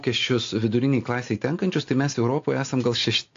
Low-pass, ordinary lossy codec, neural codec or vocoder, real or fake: 7.2 kHz; AAC, 48 kbps; none; real